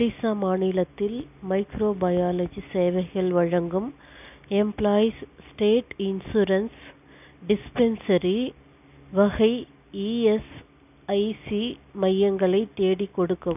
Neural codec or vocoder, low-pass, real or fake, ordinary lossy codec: none; 3.6 kHz; real; none